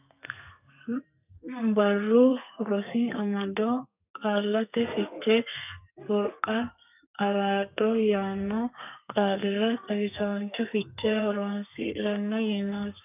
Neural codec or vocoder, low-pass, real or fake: codec, 32 kHz, 1.9 kbps, SNAC; 3.6 kHz; fake